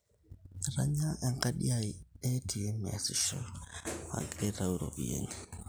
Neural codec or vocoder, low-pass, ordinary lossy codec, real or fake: none; none; none; real